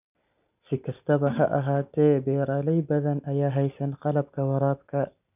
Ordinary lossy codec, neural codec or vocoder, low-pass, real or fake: none; none; 3.6 kHz; real